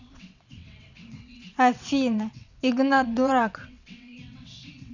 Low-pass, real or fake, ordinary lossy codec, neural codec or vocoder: 7.2 kHz; fake; none; vocoder, 22.05 kHz, 80 mel bands, WaveNeXt